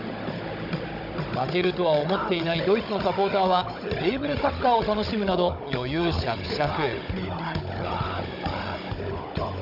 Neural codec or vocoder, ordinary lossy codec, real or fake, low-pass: codec, 16 kHz, 16 kbps, FunCodec, trained on Chinese and English, 50 frames a second; none; fake; 5.4 kHz